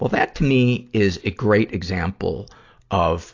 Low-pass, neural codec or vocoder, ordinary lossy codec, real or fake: 7.2 kHz; none; AAC, 48 kbps; real